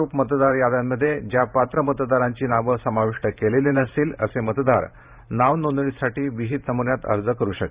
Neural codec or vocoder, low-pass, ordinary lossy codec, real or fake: vocoder, 44.1 kHz, 128 mel bands every 512 samples, BigVGAN v2; 3.6 kHz; Opus, 64 kbps; fake